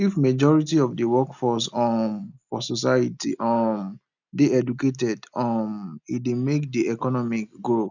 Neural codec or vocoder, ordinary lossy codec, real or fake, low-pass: none; none; real; 7.2 kHz